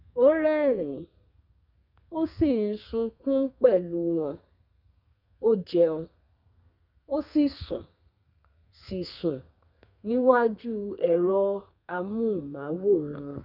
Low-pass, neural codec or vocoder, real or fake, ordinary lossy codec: 5.4 kHz; codec, 32 kHz, 1.9 kbps, SNAC; fake; none